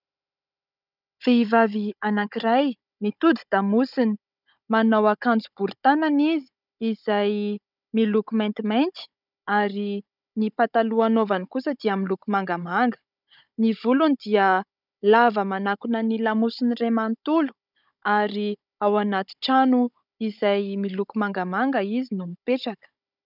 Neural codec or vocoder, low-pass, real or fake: codec, 16 kHz, 16 kbps, FunCodec, trained on Chinese and English, 50 frames a second; 5.4 kHz; fake